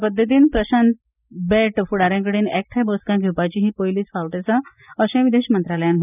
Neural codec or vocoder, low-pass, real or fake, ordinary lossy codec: none; 3.6 kHz; real; none